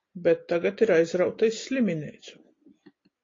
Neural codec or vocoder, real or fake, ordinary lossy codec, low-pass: none; real; AAC, 32 kbps; 7.2 kHz